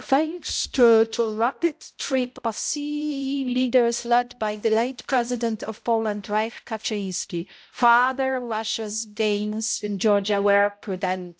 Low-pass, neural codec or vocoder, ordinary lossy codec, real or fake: none; codec, 16 kHz, 0.5 kbps, X-Codec, HuBERT features, trained on balanced general audio; none; fake